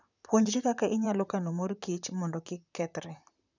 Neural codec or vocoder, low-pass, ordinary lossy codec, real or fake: vocoder, 44.1 kHz, 128 mel bands, Pupu-Vocoder; 7.2 kHz; none; fake